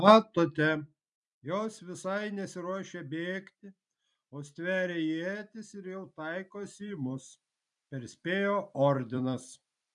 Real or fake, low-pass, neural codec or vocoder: fake; 10.8 kHz; vocoder, 44.1 kHz, 128 mel bands every 256 samples, BigVGAN v2